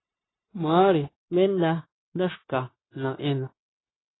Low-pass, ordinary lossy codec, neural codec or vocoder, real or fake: 7.2 kHz; AAC, 16 kbps; codec, 16 kHz, 0.9 kbps, LongCat-Audio-Codec; fake